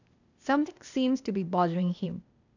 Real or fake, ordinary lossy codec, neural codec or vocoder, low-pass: fake; none; codec, 16 kHz, 0.8 kbps, ZipCodec; 7.2 kHz